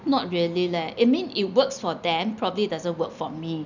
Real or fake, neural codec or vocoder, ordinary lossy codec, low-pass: real; none; none; 7.2 kHz